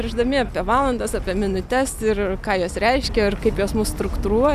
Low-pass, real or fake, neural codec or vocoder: 14.4 kHz; real; none